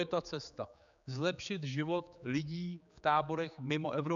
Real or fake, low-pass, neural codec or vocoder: fake; 7.2 kHz; codec, 16 kHz, 4 kbps, X-Codec, HuBERT features, trained on general audio